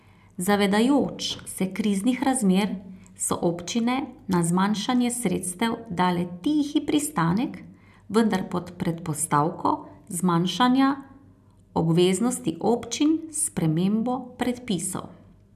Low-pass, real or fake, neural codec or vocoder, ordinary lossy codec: 14.4 kHz; real; none; none